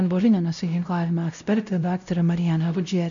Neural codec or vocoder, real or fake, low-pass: codec, 16 kHz, 0.5 kbps, X-Codec, WavLM features, trained on Multilingual LibriSpeech; fake; 7.2 kHz